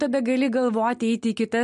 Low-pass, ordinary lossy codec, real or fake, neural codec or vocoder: 14.4 kHz; MP3, 48 kbps; real; none